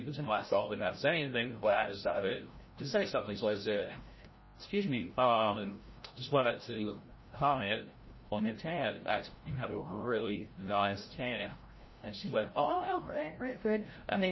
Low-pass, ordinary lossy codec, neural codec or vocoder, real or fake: 7.2 kHz; MP3, 24 kbps; codec, 16 kHz, 0.5 kbps, FreqCodec, larger model; fake